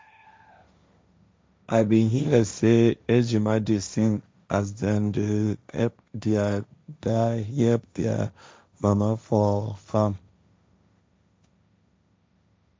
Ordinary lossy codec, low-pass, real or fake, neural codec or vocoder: none; none; fake; codec, 16 kHz, 1.1 kbps, Voila-Tokenizer